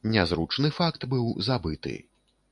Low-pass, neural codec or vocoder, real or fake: 10.8 kHz; none; real